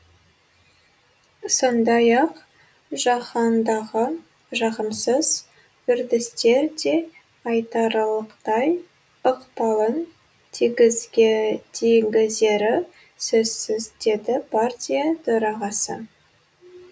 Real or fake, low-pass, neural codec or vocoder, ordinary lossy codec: real; none; none; none